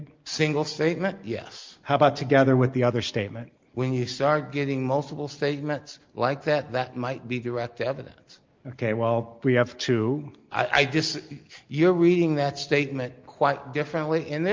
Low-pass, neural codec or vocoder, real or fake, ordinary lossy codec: 7.2 kHz; none; real; Opus, 24 kbps